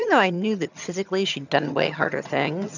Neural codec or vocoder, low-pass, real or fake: vocoder, 22.05 kHz, 80 mel bands, HiFi-GAN; 7.2 kHz; fake